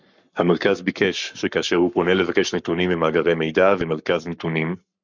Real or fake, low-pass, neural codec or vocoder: fake; 7.2 kHz; codec, 44.1 kHz, 7.8 kbps, Pupu-Codec